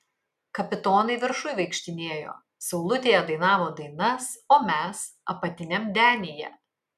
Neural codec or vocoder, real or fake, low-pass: none; real; 14.4 kHz